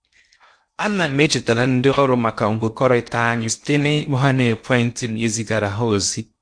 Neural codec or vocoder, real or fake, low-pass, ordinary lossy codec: codec, 16 kHz in and 24 kHz out, 0.6 kbps, FocalCodec, streaming, 4096 codes; fake; 9.9 kHz; MP3, 64 kbps